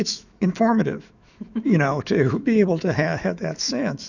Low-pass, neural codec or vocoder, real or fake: 7.2 kHz; autoencoder, 48 kHz, 128 numbers a frame, DAC-VAE, trained on Japanese speech; fake